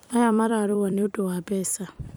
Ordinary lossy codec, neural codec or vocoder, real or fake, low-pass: none; vocoder, 44.1 kHz, 128 mel bands every 256 samples, BigVGAN v2; fake; none